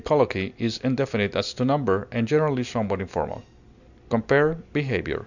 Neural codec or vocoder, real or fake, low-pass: none; real; 7.2 kHz